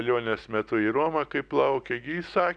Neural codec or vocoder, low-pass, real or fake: none; 9.9 kHz; real